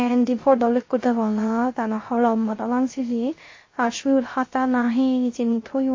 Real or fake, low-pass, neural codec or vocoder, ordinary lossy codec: fake; 7.2 kHz; codec, 16 kHz, 0.3 kbps, FocalCodec; MP3, 32 kbps